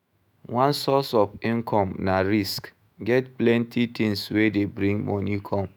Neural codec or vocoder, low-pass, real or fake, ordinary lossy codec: autoencoder, 48 kHz, 128 numbers a frame, DAC-VAE, trained on Japanese speech; none; fake; none